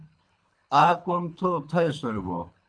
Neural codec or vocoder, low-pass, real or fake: codec, 24 kHz, 3 kbps, HILCodec; 9.9 kHz; fake